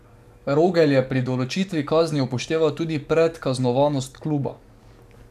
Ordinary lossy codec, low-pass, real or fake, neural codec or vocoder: none; 14.4 kHz; fake; codec, 44.1 kHz, 7.8 kbps, DAC